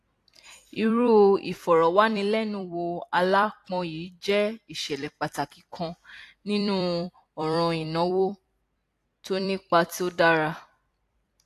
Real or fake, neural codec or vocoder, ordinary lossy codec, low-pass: fake; vocoder, 44.1 kHz, 128 mel bands every 256 samples, BigVGAN v2; AAC, 64 kbps; 14.4 kHz